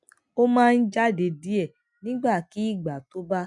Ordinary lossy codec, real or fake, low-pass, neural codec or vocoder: none; real; 10.8 kHz; none